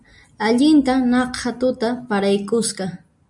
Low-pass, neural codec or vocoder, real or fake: 10.8 kHz; none; real